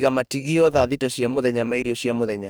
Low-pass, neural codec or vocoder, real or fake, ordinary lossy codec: none; codec, 44.1 kHz, 2.6 kbps, DAC; fake; none